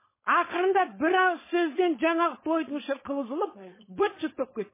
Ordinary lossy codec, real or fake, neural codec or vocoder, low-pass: MP3, 16 kbps; fake; codec, 16 kHz, 4.8 kbps, FACodec; 3.6 kHz